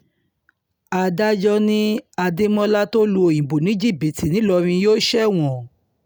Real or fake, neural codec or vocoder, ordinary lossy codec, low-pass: real; none; none; none